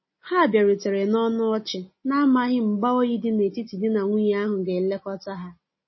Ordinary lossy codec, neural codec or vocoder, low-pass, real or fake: MP3, 24 kbps; none; 7.2 kHz; real